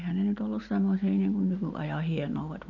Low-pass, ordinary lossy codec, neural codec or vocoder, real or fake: 7.2 kHz; none; none; real